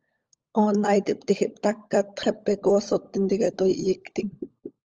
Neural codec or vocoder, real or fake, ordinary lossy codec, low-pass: codec, 16 kHz, 16 kbps, FunCodec, trained on LibriTTS, 50 frames a second; fake; Opus, 24 kbps; 7.2 kHz